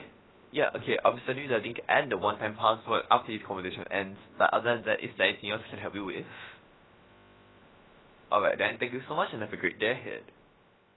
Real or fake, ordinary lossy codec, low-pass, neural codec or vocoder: fake; AAC, 16 kbps; 7.2 kHz; codec, 16 kHz, about 1 kbps, DyCAST, with the encoder's durations